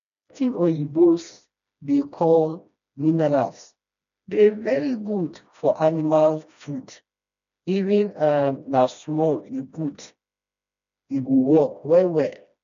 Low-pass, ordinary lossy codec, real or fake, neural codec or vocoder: 7.2 kHz; MP3, 64 kbps; fake; codec, 16 kHz, 1 kbps, FreqCodec, smaller model